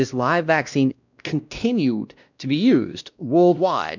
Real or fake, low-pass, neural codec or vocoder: fake; 7.2 kHz; codec, 16 kHz, 1 kbps, X-Codec, WavLM features, trained on Multilingual LibriSpeech